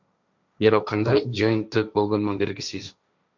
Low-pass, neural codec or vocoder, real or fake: 7.2 kHz; codec, 16 kHz, 1.1 kbps, Voila-Tokenizer; fake